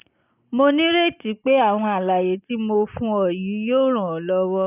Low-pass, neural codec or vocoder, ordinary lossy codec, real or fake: 3.6 kHz; none; none; real